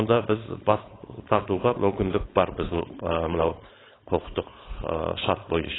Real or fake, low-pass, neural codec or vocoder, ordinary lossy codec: fake; 7.2 kHz; codec, 16 kHz, 4.8 kbps, FACodec; AAC, 16 kbps